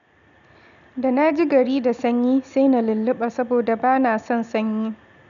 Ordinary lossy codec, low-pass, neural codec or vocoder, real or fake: none; 7.2 kHz; none; real